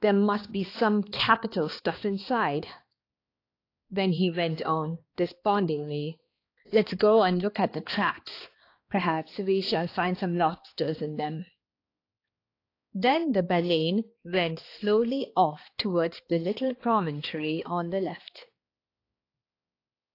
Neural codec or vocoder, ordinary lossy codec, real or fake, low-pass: codec, 16 kHz, 2 kbps, X-Codec, HuBERT features, trained on balanced general audio; AAC, 32 kbps; fake; 5.4 kHz